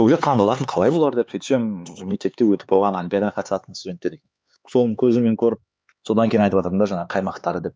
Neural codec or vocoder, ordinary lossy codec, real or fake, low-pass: codec, 16 kHz, 4 kbps, X-Codec, HuBERT features, trained on LibriSpeech; none; fake; none